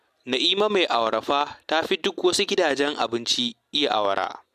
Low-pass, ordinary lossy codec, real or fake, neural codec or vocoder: 14.4 kHz; MP3, 96 kbps; real; none